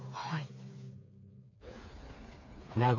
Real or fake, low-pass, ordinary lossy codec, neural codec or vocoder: fake; 7.2 kHz; none; codec, 16 kHz, 4 kbps, FreqCodec, smaller model